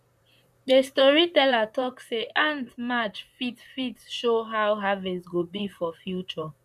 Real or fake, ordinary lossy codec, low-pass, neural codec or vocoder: fake; none; 14.4 kHz; vocoder, 44.1 kHz, 128 mel bands, Pupu-Vocoder